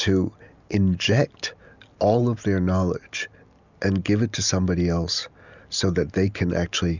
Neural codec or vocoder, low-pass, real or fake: none; 7.2 kHz; real